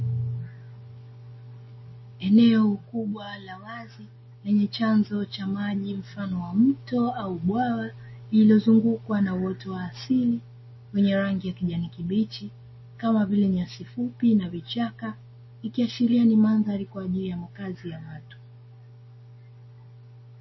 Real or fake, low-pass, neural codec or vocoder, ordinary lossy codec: real; 7.2 kHz; none; MP3, 24 kbps